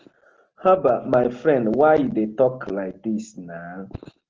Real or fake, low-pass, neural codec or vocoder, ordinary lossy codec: real; 7.2 kHz; none; Opus, 16 kbps